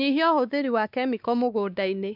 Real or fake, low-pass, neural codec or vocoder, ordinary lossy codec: fake; 5.4 kHz; codec, 16 kHz, 2 kbps, X-Codec, WavLM features, trained on Multilingual LibriSpeech; none